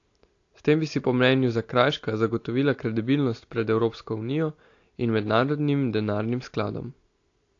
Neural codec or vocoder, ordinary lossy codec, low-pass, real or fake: none; AAC, 48 kbps; 7.2 kHz; real